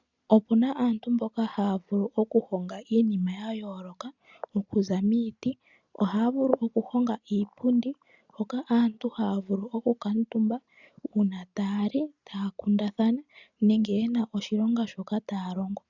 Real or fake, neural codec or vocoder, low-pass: real; none; 7.2 kHz